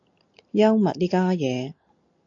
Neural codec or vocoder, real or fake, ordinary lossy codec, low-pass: none; real; AAC, 48 kbps; 7.2 kHz